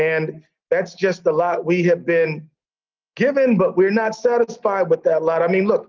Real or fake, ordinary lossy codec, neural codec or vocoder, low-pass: real; Opus, 24 kbps; none; 7.2 kHz